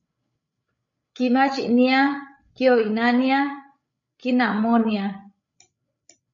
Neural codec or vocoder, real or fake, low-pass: codec, 16 kHz, 8 kbps, FreqCodec, larger model; fake; 7.2 kHz